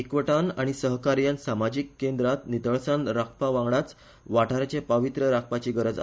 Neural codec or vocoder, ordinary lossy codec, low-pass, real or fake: none; none; none; real